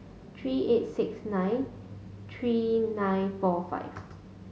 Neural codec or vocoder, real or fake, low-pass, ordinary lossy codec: none; real; none; none